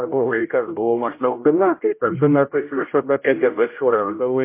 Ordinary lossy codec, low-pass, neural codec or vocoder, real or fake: MP3, 24 kbps; 3.6 kHz; codec, 16 kHz, 0.5 kbps, X-Codec, HuBERT features, trained on general audio; fake